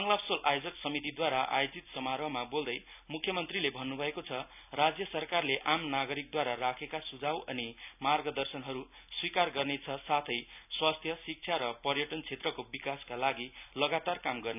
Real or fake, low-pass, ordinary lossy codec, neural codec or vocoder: real; 3.6 kHz; none; none